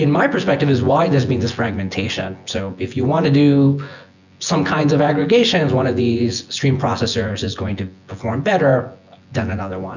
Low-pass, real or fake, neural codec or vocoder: 7.2 kHz; fake; vocoder, 24 kHz, 100 mel bands, Vocos